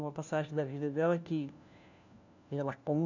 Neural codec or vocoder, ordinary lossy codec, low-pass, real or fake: codec, 16 kHz, 1 kbps, FunCodec, trained on LibriTTS, 50 frames a second; none; 7.2 kHz; fake